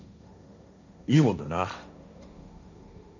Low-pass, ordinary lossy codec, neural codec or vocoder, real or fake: 7.2 kHz; none; codec, 16 kHz, 1.1 kbps, Voila-Tokenizer; fake